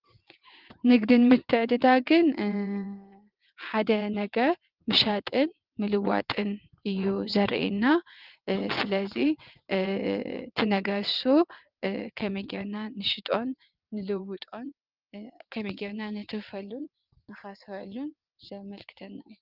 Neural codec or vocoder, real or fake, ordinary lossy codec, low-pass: vocoder, 22.05 kHz, 80 mel bands, WaveNeXt; fake; Opus, 32 kbps; 5.4 kHz